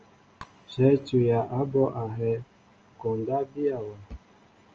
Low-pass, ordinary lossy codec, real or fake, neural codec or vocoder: 7.2 kHz; Opus, 24 kbps; real; none